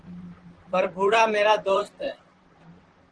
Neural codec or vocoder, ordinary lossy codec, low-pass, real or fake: vocoder, 44.1 kHz, 128 mel bands, Pupu-Vocoder; Opus, 16 kbps; 9.9 kHz; fake